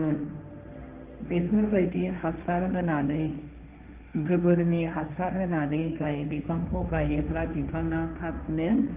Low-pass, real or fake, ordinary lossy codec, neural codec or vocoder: 3.6 kHz; fake; Opus, 24 kbps; codec, 16 kHz, 1.1 kbps, Voila-Tokenizer